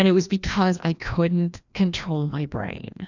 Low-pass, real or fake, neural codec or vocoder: 7.2 kHz; fake; codec, 16 kHz, 1 kbps, FreqCodec, larger model